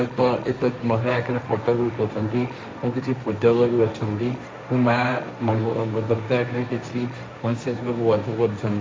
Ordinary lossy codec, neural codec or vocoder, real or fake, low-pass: none; codec, 16 kHz, 1.1 kbps, Voila-Tokenizer; fake; none